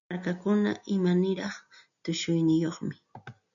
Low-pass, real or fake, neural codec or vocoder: 7.2 kHz; real; none